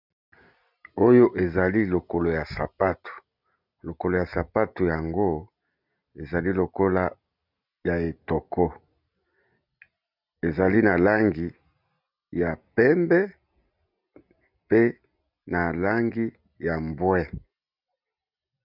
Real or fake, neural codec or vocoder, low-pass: real; none; 5.4 kHz